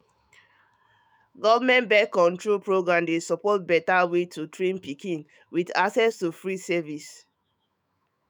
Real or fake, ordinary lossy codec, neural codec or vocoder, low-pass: fake; none; autoencoder, 48 kHz, 128 numbers a frame, DAC-VAE, trained on Japanese speech; none